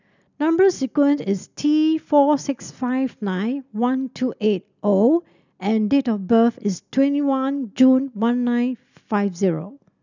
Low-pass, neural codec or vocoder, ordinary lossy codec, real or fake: 7.2 kHz; none; none; real